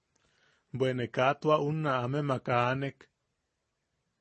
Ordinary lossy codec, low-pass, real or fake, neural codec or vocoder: MP3, 32 kbps; 10.8 kHz; real; none